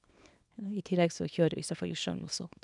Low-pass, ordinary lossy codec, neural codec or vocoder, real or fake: 10.8 kHz; none; codec, 24 kHz, 0.9 kbps, WavTokenizer, small release; fake